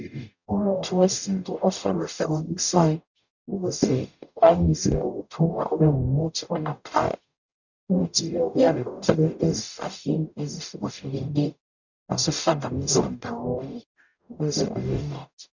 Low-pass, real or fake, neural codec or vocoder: 7.2 kHz; fake; codec, 44.1 kHz, 0.9 kbps, DAC